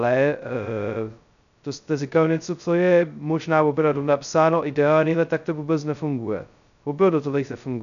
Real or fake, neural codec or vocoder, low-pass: fake; codec, 16 kHz, 0.2 kbps, FocalCodec; 7.2 kHz